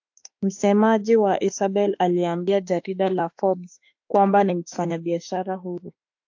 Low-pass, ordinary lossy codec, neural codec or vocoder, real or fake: 7.2 kHz; AAC, 48 kbps; autoencoder, 48 kHz, 32 numbers a frame, DAC-VAE, trained on Japanese speech; fake